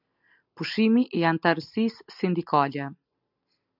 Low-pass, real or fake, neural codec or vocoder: 5.4 kHz; real; none